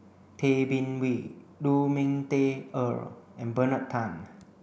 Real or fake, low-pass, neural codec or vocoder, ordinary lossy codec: real; none; none; none